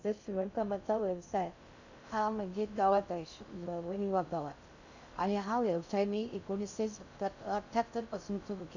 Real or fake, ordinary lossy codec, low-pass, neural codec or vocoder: fake; none; 7.2 kHz; codec, 16 kHz in and 24 kHz out, 0.6 kbps, FocalCodec, streaming, 2048 codes